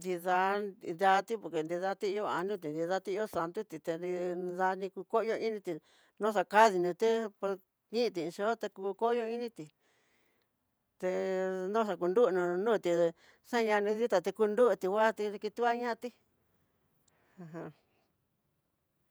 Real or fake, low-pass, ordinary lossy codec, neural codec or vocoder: fake; none; none; vocoder, 48 kHz, 128 mel bands, Vocos